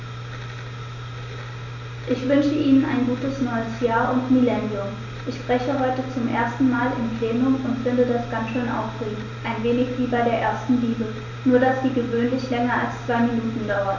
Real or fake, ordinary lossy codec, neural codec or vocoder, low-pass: real; none; none; 7.2 kHz